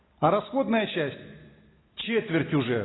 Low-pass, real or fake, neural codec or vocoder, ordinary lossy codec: 7.2 kHz; real; none; AAC, 16 kbps